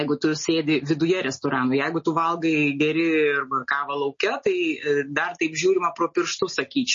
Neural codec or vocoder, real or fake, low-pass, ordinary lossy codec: none; real; 7.2 kHz; MP3, 32 kbps